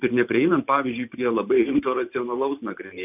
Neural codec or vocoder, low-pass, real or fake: vocoder, 44.1 kHz, 128 mel bands every 512 samples, BigVGAN v2; 3.6 kHz; fake